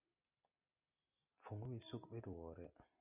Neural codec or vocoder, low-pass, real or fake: none; 3.6 kHz; real